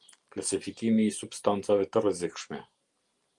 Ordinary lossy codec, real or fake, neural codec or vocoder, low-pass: Opus, 32 kbps; fake; vocoder, 44.1 kHz, 128 mel bands every 512 samples, BigVGAN v2; 10.8 kHz